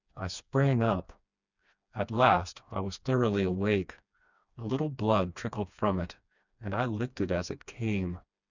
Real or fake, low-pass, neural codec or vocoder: fake; 7.2 kHz; codec, 16 kHz, 2 kbps, FreqCodec, smaller model